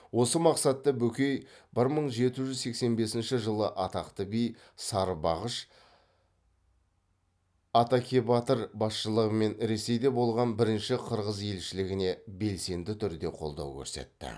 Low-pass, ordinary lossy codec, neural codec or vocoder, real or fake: none; none; none; real